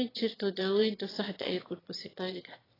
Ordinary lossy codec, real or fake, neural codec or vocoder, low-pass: AAC, 24 kbps; fake; autoencoder, 22.05 kHz, a latent of 192 numbers a frame, VITS, trained on one speaker; 5.4 kHz